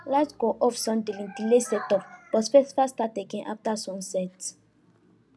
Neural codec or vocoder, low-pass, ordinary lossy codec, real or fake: none; none; none; real